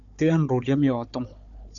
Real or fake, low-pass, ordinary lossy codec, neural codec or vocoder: fake; 7.2 kHz; MP3, 96 kbps; codec, 16 kHz, 4 kbps, FunCodec, trained on Chinese and English, 50 frames a second